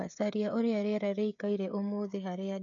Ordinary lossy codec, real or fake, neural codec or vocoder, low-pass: none; fake; codec, 16 kHz, 16 kbps, FreqCodec, smaller model; 7.2 kHz